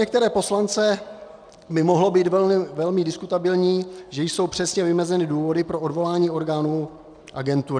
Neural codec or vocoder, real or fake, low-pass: none; real; 9.9 kHz